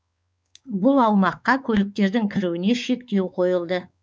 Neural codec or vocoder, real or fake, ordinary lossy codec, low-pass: codec, 16 kHz, 4 kbps, X-Codec, WavLM features, trained on Multilingual LibriSpeech; fake; none; none